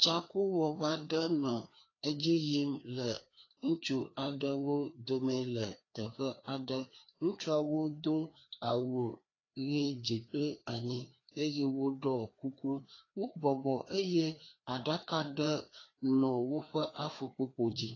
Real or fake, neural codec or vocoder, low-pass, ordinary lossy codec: fake; codec, 16 kHz, 2 kbps, FreqCodec, larger model; 7.2 kHz; AAC, 32 kbps